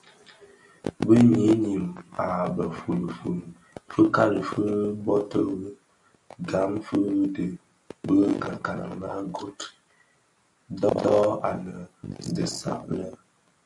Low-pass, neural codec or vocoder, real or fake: 10.8 kHz; none; real